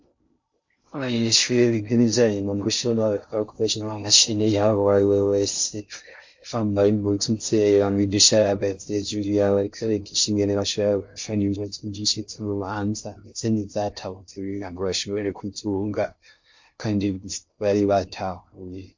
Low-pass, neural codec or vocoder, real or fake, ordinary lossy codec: 7.2 kHz; codec, 16 kHz in and 24 kHz out, 0.6 kbps, FocalCodec, streaming, 2048 codes; fake; MP3, 48 kbps